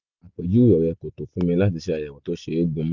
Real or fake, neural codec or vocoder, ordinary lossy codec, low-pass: real; none; Opus, 64 kbps; 7.2 kHz